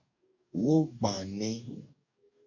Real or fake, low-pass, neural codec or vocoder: fake; 7.2 kHz; codec, 44.1 kHz, 2.6 kbps, DAC